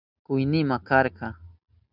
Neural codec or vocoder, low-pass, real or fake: none; 5.4 kHz; real